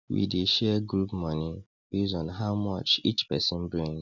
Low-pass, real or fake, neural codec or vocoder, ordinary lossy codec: 7.2 kHz; real; none; none